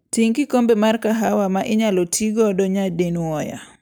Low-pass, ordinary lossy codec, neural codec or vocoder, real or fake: none; none; none; real